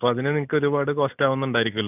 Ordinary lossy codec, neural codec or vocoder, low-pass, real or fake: none; none; 3.6 kHz; real